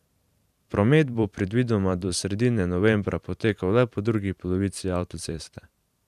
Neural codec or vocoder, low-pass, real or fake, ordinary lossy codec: vocoder, 44.1 kHz, 128 mel bands every 512 samples, BigVGAN v2; 14.4 kHz; fake; none